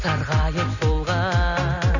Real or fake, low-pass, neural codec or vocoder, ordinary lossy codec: real; 7.2 kHz; none; none